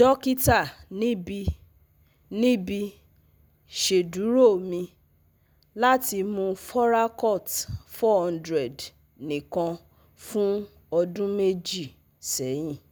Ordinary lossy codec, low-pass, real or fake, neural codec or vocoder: none; none; real; none